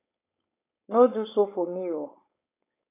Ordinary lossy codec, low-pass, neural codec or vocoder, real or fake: AAC, 24 kbps; 3.6 kHz; codec, 16 kHz, 4.8 kbps, FACodec; fake